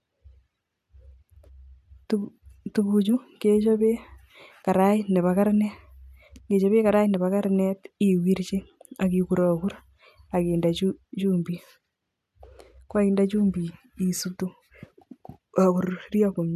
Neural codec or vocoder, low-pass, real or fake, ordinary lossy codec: none; 14.4 kHz; real; none